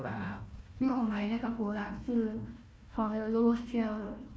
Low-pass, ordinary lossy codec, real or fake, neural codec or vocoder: none; none; fake; codec, 16 kHz, 1 kbps, FunCodec, trained on Chinese and English, 50 frames a second